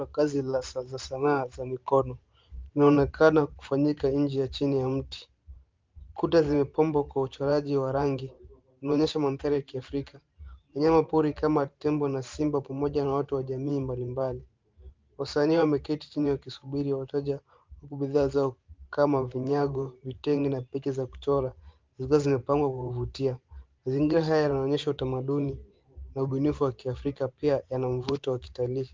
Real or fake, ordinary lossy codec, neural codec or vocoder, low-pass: fake; Opus, 24 kbps; vocoder, 44.1 kHz, 128 mel bands every 512 samples, BigVGAN v2; 7.2 kHz